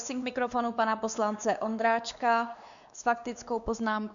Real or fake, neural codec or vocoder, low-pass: fake; codec, 16 kHz, 2 kbps, X-Codec, WavLM features, trained on Multilingual LibriSpeech; 7.2 kHz